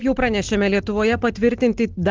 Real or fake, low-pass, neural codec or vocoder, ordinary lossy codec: real; 7.2 kHz; none; Opus, 16 kbps